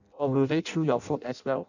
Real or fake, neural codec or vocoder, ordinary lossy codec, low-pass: fake; codec, 16 kHz in and 24 kHz out, 0.6 kbps, FireRedTTS-2 codec; none; 7.2 kHz